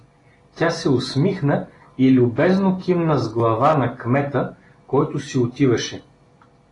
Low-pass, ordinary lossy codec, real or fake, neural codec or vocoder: 10.8 kHz; AAC, 32 kbps; real; none